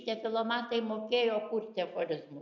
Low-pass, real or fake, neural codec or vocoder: 7.2 kHz; real; none